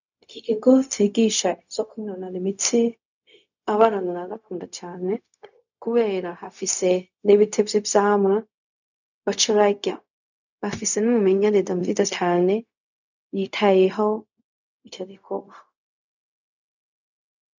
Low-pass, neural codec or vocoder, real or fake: 7.2 kHz; codec, 16 kHz, 0.4 kbps, LongCat-Audio-Codec; fake